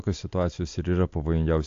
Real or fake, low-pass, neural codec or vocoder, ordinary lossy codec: real; 7.2 kHz; none; AAC, 48 kbps